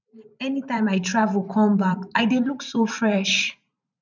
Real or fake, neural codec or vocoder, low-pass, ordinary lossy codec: fake; vocoder, 44.1 kHz, 128 mel bands every 512 samples, BigVGAN v2; 7.2 kHz; none